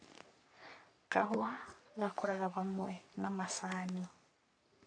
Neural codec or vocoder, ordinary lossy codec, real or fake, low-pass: codec, 44.1 kHz, 3.4 kbps, Pupu-Codec; AAC, 48 kbps; fake; 9.9 kHz